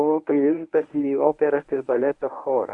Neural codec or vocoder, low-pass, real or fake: codec, 24 kHz, 0.9 kbps, WavTokenizer, medium speech release version 1; 10.8 kHz; fake